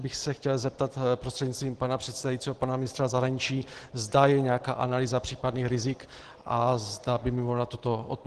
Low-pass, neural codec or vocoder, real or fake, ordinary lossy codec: 10.8 kHz; none; real; Opus, 16 kbps